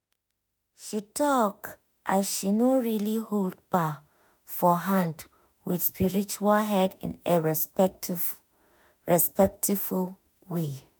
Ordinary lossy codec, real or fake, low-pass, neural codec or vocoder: none; fake; none; autoencoder, 48 kHz, 32 numbers a frame, DAC-VAE, trained on Japanese speech